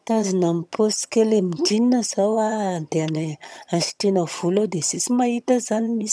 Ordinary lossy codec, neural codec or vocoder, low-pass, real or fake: none; vocoder, 22.05 kHz, 80 mel bands, HiFi-GAN; none; fake